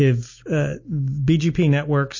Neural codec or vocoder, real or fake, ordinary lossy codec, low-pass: none; real; MP3, 32 kbps; 7.2 kHz